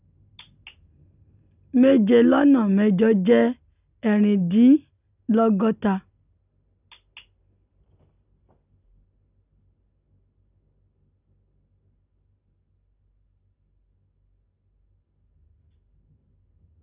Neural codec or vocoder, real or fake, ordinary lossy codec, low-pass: none; real; none; 3.6 kHz